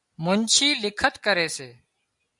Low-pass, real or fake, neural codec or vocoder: 10.8 kHz; real; none